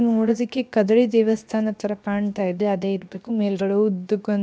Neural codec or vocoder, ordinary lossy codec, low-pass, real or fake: codec, 16 kHz, 0.7 kbps, FocalCodec; none; none; fake